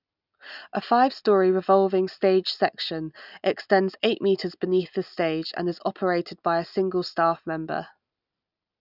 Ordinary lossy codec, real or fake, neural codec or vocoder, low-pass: none; real; none; 5.4 kHz